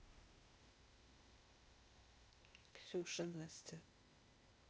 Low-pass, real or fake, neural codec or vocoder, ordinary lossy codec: none; fake; codec, 16 kHz, 0.8 kbps, ZipCodec; none